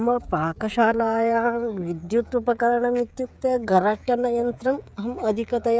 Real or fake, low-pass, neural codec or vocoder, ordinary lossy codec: fake; none; codec, 16 kHz, 16 kbps, FreqCodec, smaller model; none